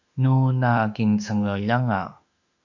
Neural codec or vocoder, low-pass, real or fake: autoencoder, 48 kHz, 32 numbers a frame, DAC-VAE, trained on Japanese speech; 7.2 kHz; fake